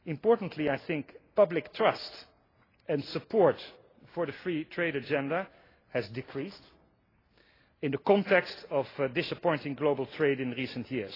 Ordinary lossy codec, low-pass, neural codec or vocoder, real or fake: AAC, 24 kbps; 5.4 kHz; none; real